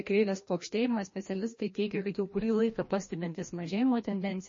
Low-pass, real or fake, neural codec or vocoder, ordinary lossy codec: 7.2 kHz; fake; codec, 16 kHz, 1 kbps, FreqCodec, larger model; MP3, 32 kbps